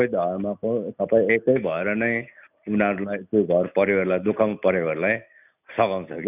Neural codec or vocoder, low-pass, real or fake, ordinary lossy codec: none; 3.6 kHz; real; none